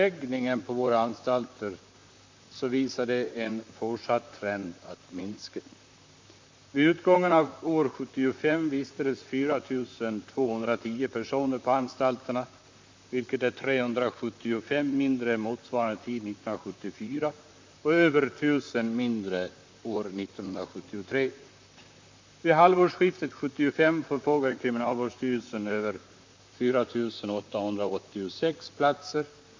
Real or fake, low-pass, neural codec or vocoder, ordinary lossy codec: fake; 7.2 kHz; vocoder, 44.1 kHz, 128 mel bands, Pupu-Vocoder; MP3, 64 kbps